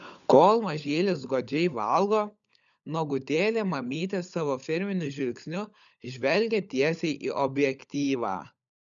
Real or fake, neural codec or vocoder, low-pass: fake; codec, 16 kHz, 16 kbps, FunCodec, trained on LibriTTS, 50 frames a second; 7.2 kHz